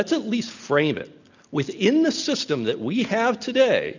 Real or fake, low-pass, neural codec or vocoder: real; 7.2 kHz; none